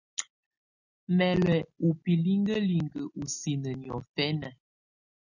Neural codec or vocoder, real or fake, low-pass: none; real; 7.2 kHz